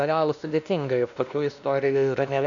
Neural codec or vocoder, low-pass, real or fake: codec, 16 kHz, 1 kbps, X-Codec, WavLM features, trained on Multilingual LibriSpeech; 7.2 kHz; fake